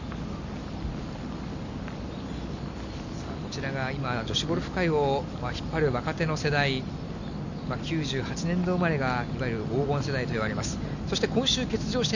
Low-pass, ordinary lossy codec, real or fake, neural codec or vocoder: 7.2 kHz; none; real; none